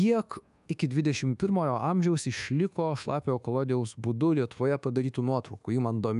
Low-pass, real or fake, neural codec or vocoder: 10.8 kHz; fake; codec, 24 kHz, 1.2 kbps, DualCodec